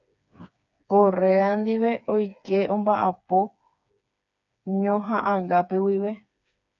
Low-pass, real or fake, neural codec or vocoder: 7.2 kHz; fake; codec, 16 kHz, 4 kbps, FreqCodec, smaller model